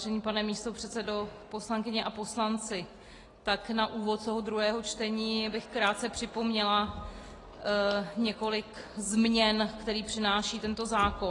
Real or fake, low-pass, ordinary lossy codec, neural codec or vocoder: real; 10.8 kHz; AAC, 32 kbps; none